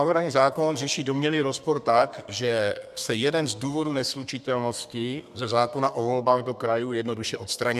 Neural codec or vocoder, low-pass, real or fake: codec, 32 kHz, 1.9 kbps, SNAC; 14.4 kHz; fake